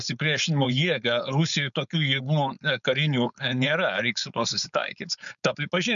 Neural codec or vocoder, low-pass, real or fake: codec, 16 kHz, 4.8 kbps, FACodec; 7.2 kHz; fake